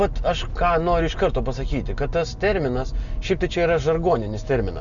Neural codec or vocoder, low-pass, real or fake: none; 7.2 kHz; real